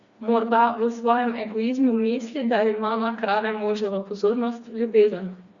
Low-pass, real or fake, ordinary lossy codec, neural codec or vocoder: 7.2 kHz; fake; Opus, 64 kbps; codec, 16 kHz, 2 kbps, FreqCodec, smaller model